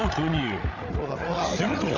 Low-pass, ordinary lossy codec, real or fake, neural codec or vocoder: 7.2 kHz; none; fake; codec, 16 kHz, 16 kbps, FreqCodec, larger model